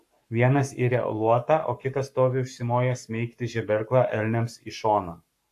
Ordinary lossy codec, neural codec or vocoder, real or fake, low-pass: AAC, 64 kbps; codec, 44.1 kHz, 7.8 kbps, Pupu-Codec; fake; 14.4 kHz